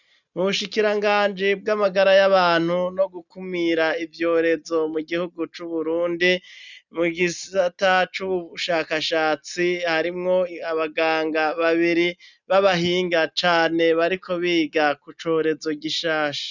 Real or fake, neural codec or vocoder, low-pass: real; none; 7.2 kHz